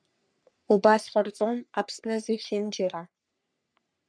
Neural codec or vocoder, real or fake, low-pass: codec, 44.1 kHz, 3.4 kbps, Pupu-Codec; fake; 9.9 kHz